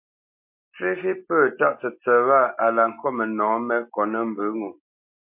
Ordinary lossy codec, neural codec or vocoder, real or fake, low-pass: MP3, 32 kbps; none; real; 3.6 kHz